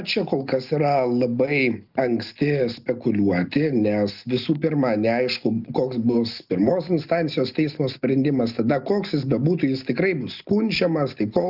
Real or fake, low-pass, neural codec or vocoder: real; 5.4 kHz; none